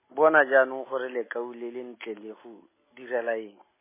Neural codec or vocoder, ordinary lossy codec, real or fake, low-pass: none; MP3, 16 kbps; real; 3.6 kHz